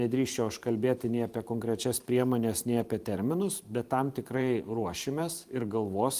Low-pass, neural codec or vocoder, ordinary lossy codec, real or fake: 14.4 kHz; vocoder, 44.1 kHz, 128 mel bands every 512 samples, BigVGAN v2; Opus, 24 kbps; fake